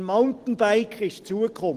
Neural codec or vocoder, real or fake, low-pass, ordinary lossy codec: none; real; 14.4 kHz; Opus, 16 kbps